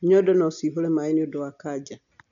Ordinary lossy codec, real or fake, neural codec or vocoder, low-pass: none; real; none; 7.2 kHz